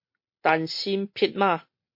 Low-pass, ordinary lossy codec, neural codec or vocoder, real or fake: 5.4 kHz; MP3, 32 kbps; none; real